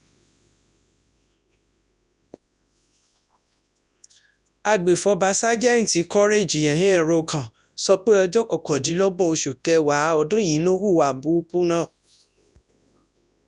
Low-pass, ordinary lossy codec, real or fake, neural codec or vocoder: 10.8 kHz; none; fake; codec, 24 kHz, 0.9 kbps, WavTokenizer, large speech release